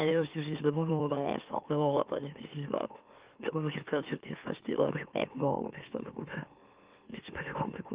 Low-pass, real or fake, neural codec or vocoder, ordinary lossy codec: 3.6 kHz; fake; autoencoder, 44.1 kHz, a latent of 192 numbers a frame, MeloTTS; Opus, 32 kbps